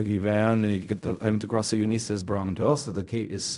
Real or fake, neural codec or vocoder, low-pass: fake; codec, 16 kHz in and 24 kHz out, 0.4 kbps, LongCat-Audio-Codec, fine tuned four codebook decoder; 10.8 kHz